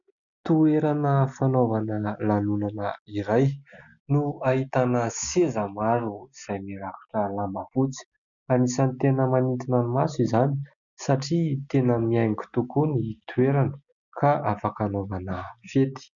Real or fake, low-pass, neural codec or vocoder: real; 7.2 kHz; none